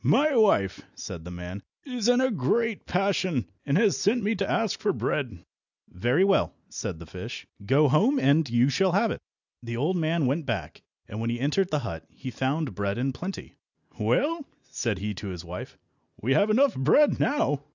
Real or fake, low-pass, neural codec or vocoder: real; 7.2 kHz; none